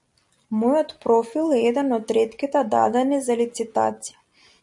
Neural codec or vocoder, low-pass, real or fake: none; 10.8 kHz; real